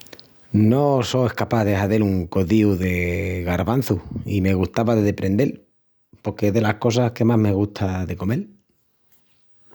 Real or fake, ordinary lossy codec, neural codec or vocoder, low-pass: real; none; none; none